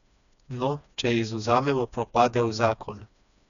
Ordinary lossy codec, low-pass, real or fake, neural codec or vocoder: none; 7.2 kHz; fake; codec, 16 kHz, 2 kbps, FreqCodec, smaller model